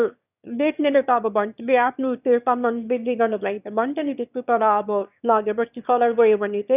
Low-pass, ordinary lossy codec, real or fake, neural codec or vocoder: 3.6 kHz; none; fake; autoencoder, 22.05 kHz, a latent of 192 numbers a frame, VITS, trained on one speaker